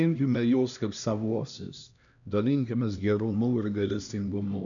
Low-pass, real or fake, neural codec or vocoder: 7.2 kHz; fake; codec, 16 kHz, 1 kbps, X-Codec, HuBERT features, trained on LibriSpeech